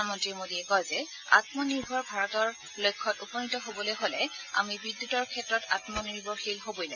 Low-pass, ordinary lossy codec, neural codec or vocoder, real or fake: 7.2 kHz; AAC, 48 kbps; none; real